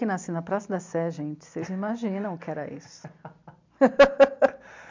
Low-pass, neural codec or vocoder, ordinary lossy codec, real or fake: 7.2 kHz; none; MP3, 64 kbps; real